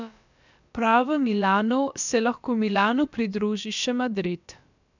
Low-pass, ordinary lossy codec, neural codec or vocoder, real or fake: 7.2 kHz; none; codec, 16 kHz, about 1 kbps, DyCAST, with the encoder's durations; fake